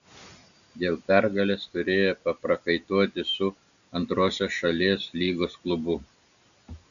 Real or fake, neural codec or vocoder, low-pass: real; none; 7.2 kHz